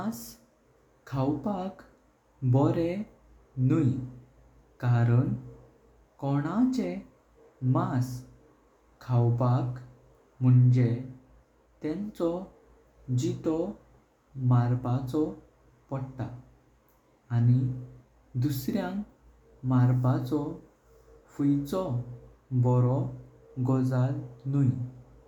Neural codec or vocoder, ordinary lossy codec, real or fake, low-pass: none; none; real; 19.8 kHz